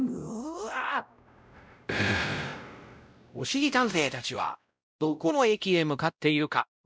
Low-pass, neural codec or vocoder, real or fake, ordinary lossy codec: none; codec, 16 kHz, 0.5 kbps, X-Codec, WavLM features, trained on Multilingual LibriSpeech; fake; none